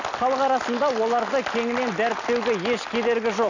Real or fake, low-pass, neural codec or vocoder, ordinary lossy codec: real; 7.2 kHz; none; none